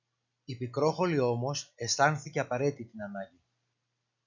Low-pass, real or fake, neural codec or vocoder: 7.2 kHz; real; none